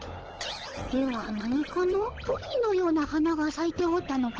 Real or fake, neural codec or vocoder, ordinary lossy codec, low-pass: fake; codec, 16 kHz, 16 kbps, FunCodec, trained on LibriTTS, 50 frames a second; Opus, 16 kbps; 7.2 kHz